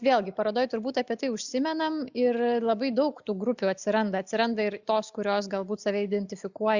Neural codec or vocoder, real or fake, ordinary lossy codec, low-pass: none; real; Opus, 64 kbps; 7.2 kHz